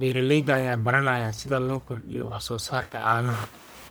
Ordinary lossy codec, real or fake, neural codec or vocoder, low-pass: none; fake; codec, 44.1 kHz, 1.7 kbps, Pupu-Codec; none